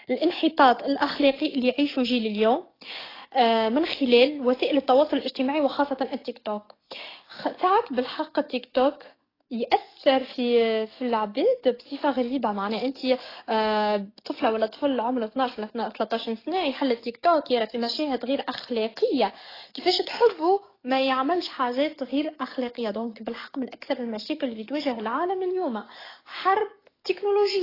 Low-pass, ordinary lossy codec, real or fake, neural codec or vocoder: 5.4 kHz; AAC, 24 kbps; fake; codec, 44.1 kHz, 7.8 kbps, DAC